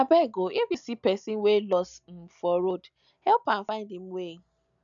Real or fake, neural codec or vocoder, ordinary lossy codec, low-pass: real; none; none; 7.2 kHz